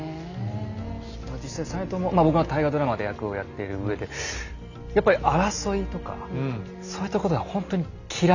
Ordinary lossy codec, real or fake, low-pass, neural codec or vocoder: none; real; 7.2 kHz; none